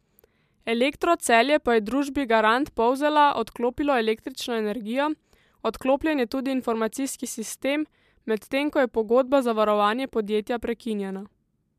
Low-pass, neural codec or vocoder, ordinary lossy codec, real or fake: 14.4 kHz; none; MP3, 96 kbps; real